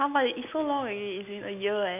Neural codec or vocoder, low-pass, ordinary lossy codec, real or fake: none; 3.6 kHz; none; real